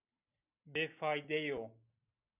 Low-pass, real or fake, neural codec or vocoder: 3.6 kHz; real; none